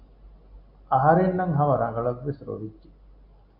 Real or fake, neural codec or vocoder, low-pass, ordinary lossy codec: real; none; 5.4 kHz; AAC, 32 kbps